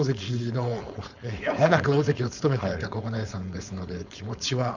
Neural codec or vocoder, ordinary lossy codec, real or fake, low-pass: codec, 16 kHz, 4.8 kbps, FACodec; Opus, 64 kbps; fake; 7.2 kHz